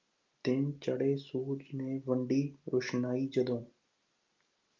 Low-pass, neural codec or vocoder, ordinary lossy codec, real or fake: 7.2 kHz; none; Opus, 32 kbps; real